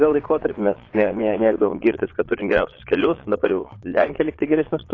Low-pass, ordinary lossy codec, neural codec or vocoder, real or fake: 7.2 kHz; AAC, 32 kbps; vocoder, 22.05 kHz, 80 mel bands, Vocos; fake